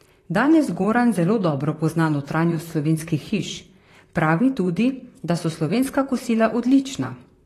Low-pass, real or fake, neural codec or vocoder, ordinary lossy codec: 14.4 kHz; fake; vocoder, 44.1 kHz, 128 mel bands, Pupu-Vocoder; AAC, 48 kbps